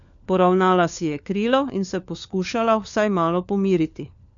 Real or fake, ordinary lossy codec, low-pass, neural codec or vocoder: fake; none; 7.2 kHz; codec, 16 kHz, 4 kbps, FunCodec, trained on LibriTTS, 50 frames a second